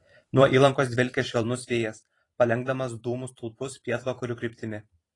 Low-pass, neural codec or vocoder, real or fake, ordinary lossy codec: 10.8 kHz; vocoder, 44.1 kHz, 128 mel bands every 512 samples, BigVGAN v2; fake; AAC, 32 kbps